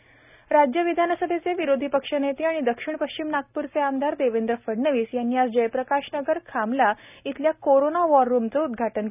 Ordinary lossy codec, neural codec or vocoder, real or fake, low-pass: none; none; real; 3.6 kHz